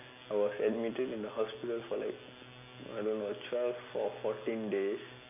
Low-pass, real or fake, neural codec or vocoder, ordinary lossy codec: 3.6 kHz; real; none; none